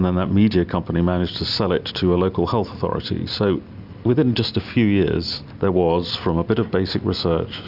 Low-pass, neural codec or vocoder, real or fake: 5.4 kHz; none; real